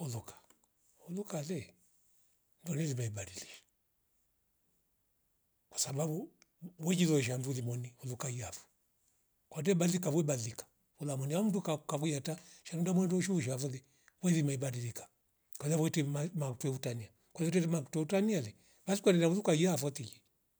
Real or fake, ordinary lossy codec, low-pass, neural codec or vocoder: real; none; none; none